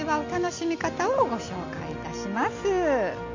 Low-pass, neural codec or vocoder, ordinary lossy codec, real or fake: 7.2 kHz; none; MP3, 64 kbps; real